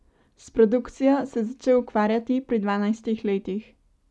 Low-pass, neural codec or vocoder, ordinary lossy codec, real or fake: none; none; none; real